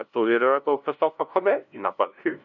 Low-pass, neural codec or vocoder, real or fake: 7.2 kHz; codec, 16 kHz, 0.5 kbps, FunCodec, trained on LibriTTS, 25 frames a second; fake